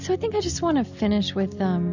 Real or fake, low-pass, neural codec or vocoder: real; 7.2 kHz; none